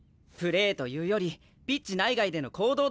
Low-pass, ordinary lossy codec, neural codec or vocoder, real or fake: none; none; none; real